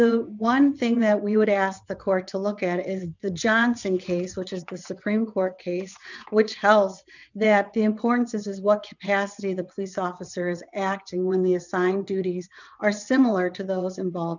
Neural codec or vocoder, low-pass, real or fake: vocoder, 22.05 kHz, 80 mel bands, WaveNeXt; 7.2 kHz; fake